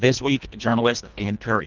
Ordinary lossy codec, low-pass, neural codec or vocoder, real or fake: Opus, 24 kbps; 7.2 kHz; codec, 24 kHz, 1.5 kbps, HILCodec; fake